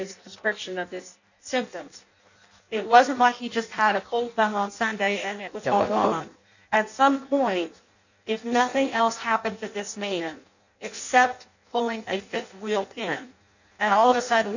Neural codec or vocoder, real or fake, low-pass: codec, 16 kHz in and 24 kHz out, 0.6 kbps, FireRedTTS-2 codec; fake; 7.2 kHz